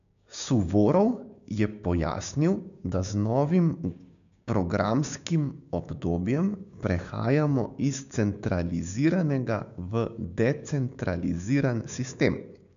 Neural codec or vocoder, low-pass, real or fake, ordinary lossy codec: codec, 16 kHz, 6 kbps, DAC; 7.2 kHz; fake; none